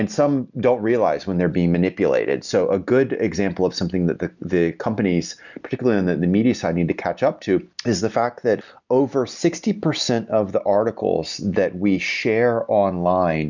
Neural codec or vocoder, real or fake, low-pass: none; real; 7.2 kHz